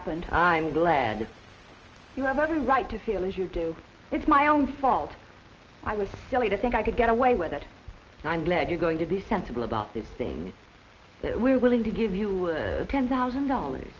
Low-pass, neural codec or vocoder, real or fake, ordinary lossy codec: 7.2 kHz; none; real; Opus, 24 kbps